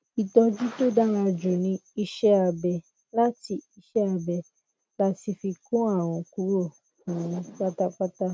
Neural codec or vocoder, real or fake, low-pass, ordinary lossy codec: none; real; none; none